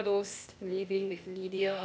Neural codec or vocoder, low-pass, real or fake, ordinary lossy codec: codec, 16 kHz, 0.8 kbps, ZipCodec; none; fake; none